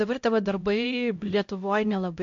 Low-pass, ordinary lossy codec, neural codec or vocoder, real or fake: 7.2 kHz; MP3, 48 kbps; codec, 16 kHz, 0.5 kbps, X-Codec, HuBERT features, trained on LibriSpeech; fake